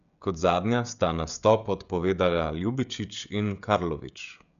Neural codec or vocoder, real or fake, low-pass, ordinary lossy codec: codec, 16 kHz, 16 kbps, FreqCodec, smaller model; fake; 7.2 kHz; none